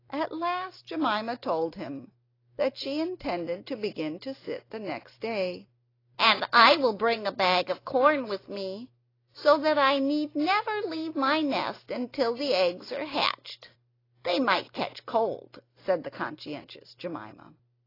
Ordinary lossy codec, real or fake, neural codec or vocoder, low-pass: AAC, 24 kbps; real; none; 5.4 kHz